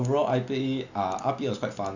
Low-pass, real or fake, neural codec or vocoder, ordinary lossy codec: 7.2 kHz; real; none; none